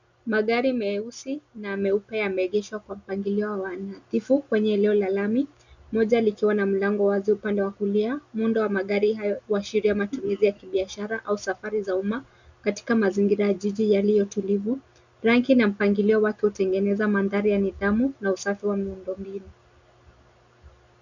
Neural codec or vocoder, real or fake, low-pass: none; real; 7.2 kHz